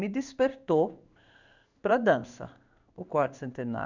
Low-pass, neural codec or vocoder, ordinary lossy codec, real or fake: 7.2 kHz; codec, 16 kHz in and 24 kHz out, 1 kbps, XY-Tokenizer; none; fake